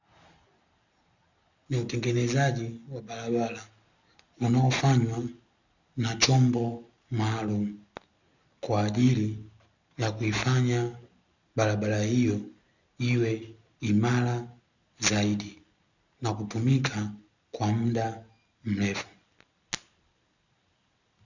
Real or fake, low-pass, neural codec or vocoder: real; 7.2 kHz; none